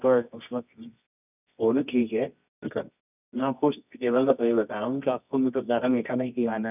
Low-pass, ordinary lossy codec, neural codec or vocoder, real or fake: 3.6 kHz; none; codec, 24 kHz, 0.9 kbps, WavTokenizer, medium music audio release; fake